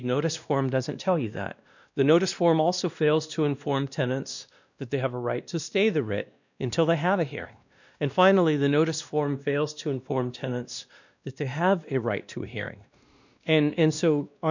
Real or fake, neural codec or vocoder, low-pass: fake; codec, 16 kHz, 1 kbps, X-Codec, WavLM features, trained on Multilingual LibriSpeech; 7.2 kHz